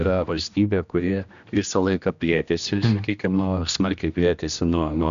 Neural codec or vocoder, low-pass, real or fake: codec, 16 kHz, 1 kbps, X-Codec, HuBERT features, trained on general audio; 7.2 kHz; fake